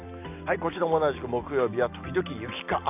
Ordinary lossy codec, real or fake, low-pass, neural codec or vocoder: none; real; 3.6 kHz; none